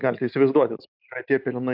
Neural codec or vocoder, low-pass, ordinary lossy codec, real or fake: none; 5.4 kHz; AAC, 48 kbps; real